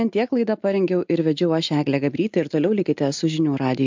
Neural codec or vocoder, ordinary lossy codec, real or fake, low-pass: none; MP3, 64 kbps; real; 7.2 kHz